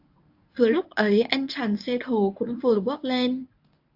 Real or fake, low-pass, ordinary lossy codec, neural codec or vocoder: fake; 5.4 kHz; AAC, 48 kbps; codec, 24 kHz, 0.9 kbps, WavTokenizer, medium speech release version 1